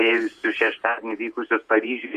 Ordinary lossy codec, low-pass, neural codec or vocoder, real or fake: Opus, 64 kbps; 14.4 kHz; vocoder, 48 kHz, 128 mel bands, Vocos; fake